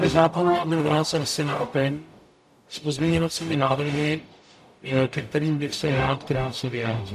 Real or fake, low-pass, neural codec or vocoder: fake; 14.4 kHz; codec, 44.1 kHz, 0.9 kbps, DAC